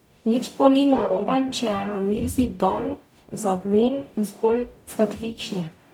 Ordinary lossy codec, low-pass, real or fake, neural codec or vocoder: none; 19.8 kHz; fake; codec, 44.1 kHz, 0.9 kbps, DAC